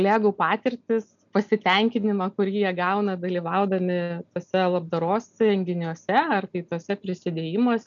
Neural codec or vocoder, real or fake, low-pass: none; real; 7.2 kHz